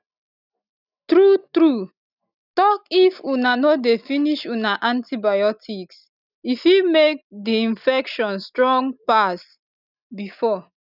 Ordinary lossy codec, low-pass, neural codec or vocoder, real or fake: none; 5.4 kHz; none; real